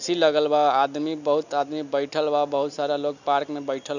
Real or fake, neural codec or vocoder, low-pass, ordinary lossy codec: real; none; 7.2 kHz; none